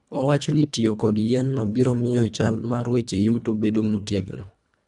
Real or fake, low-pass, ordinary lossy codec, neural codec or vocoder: fake; 10.8 kHz; none; codec, 24 kHz, 1.5 kbps, HILCodec